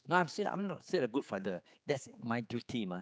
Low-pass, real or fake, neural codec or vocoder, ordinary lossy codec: none; fake; codec, 16 kHz, 4 kbps, X-Codec, HuBERT features, trained on general audio; none